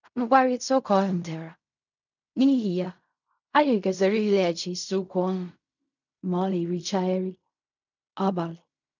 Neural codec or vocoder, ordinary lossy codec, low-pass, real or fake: codec, 16 kHz in and 24 kHz out, 0.4 kbps, LongCat-Audio-Codec, fine tuned four codebook decoder; none; 7.2 kHz; fake